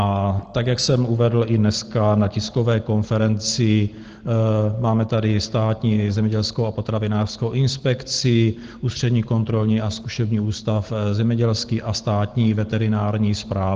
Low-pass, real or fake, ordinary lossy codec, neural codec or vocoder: 7.2 kHz; real; Opus, 16 kbps; none